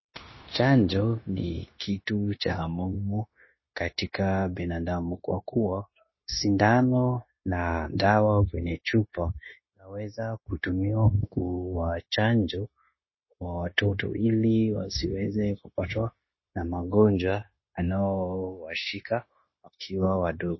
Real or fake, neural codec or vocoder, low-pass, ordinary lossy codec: fake; codec, 16 kHz, 0.9 kbps, LongCat-Audio-Codec; 7.2 kHz; MP3, 24 kbps